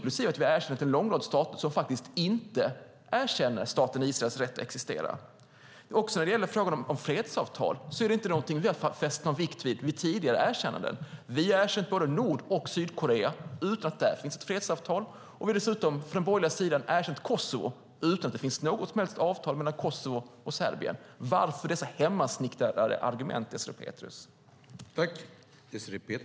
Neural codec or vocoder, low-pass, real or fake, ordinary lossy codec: none; none; real; none